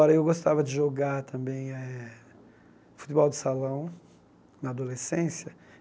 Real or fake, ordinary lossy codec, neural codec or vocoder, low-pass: real; none; none; none